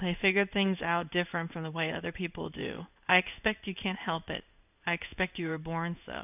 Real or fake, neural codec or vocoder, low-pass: fake; codec, 16 kHz in and 24 kHz out, 1 kbps, XY-Tokenizer; 3.6 kHz